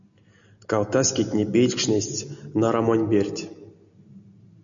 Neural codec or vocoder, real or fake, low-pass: none; real; 7.2 kHz